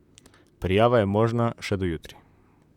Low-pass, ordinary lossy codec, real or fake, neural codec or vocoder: 19.8 kHz; none; fake; vocoder, 44.1 kHz, 128 mel bands, Pupu-Vocoder